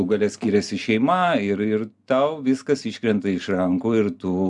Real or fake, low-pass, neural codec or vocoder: real; 10.8 kHz; none